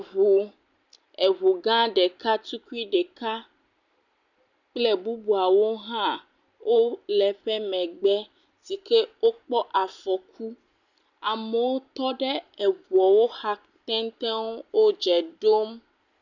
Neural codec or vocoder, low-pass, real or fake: none; 7.2 kHz; real